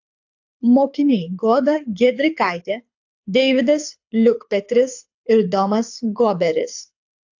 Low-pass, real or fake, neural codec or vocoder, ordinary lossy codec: 7.2 kHz; fake; codec, 24 kHz, 6 kbps, HILCodec; AAC, 48 kbps